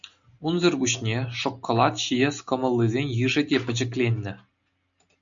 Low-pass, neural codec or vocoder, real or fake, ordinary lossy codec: 7.2 kHz; none; real; MP3, 64 kbps